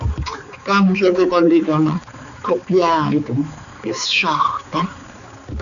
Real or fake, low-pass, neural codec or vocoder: fake; 7.2 kHz; codec, 16 kHz, 4 kbps, X-Codec, HuBERT features, trained on balanced general audio